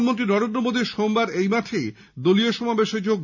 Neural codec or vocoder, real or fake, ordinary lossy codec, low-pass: none; real; none; 7.2 kHz